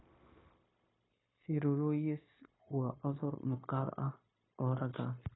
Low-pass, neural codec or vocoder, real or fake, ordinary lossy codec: 7.2 kHz; codec, 16 kHz, 0.9 kbps, LongCat-Audio-Codec; fake; AAC, 16 kbps